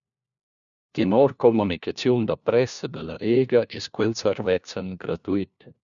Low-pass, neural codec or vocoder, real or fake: 7.2 kHz; codec, 16 kHz, 1 kbps, FunCodec, trained on LibriTTS, 50 frames a second; fake